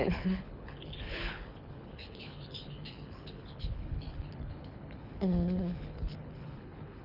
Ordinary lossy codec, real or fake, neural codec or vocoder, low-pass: none; fake; codec, 24 kHz, 6 kbps, HILCodec; 5.4 kHz